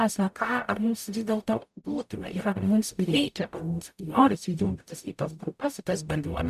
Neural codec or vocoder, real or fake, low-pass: codec, 44.1 kHz, 0.9 kbps, DAC; fake; 14.4 kHz